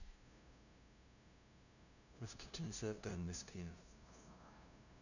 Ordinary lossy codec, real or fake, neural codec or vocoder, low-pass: none; fake; codec, 16 kHz, 0.5 kbps, FunCodec, trained on LibriTTS, 25 frames a second; 7.2 kHz